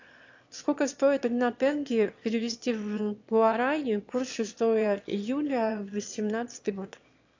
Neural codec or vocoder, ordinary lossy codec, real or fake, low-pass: autoencoder, 22.05 kHz, a latent of 192 numbers a frame, VITS, trained on one speaker; AAC, 48 kbps; fake; 7.2 kHz